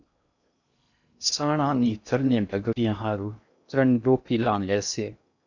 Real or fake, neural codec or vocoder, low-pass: fake; codec, 16 kHz in and 24 kHz out, 0.6 kbps, FocalCodec, streaming, 2048 codes; 7.2 kHz